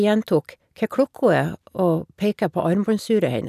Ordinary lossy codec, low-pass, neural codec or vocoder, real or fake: none; 14.4 kHz; none; real